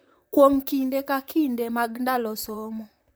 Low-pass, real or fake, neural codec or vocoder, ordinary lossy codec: none; fake; vocoder, 44.1 kHz, 128 mel bands, Pupu-Vocoder; none